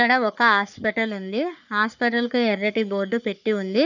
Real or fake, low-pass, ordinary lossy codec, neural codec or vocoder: fake; 7.2 kHz; none; codec, 44.1 kHz, 3.4 kbps, Pupu-Codec